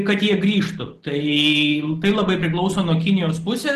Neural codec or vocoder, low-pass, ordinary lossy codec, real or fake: none; 14.4 kHz; Opus, 16 kbps; real